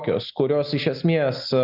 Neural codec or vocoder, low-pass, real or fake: none; 5.4 kHz; real